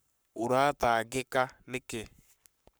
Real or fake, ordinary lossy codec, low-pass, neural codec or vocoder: fake; none; none; codec, 44.1 kHz, 7.8 kbps, Pupu-Codec